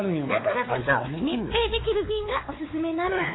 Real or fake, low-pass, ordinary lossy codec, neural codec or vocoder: fake; 7.2 kHz; AAC, 16 kbps; codec, 16 kHz, 4 kbps, X-Codec, WavLM features, trained on Multilingual LibriSpeech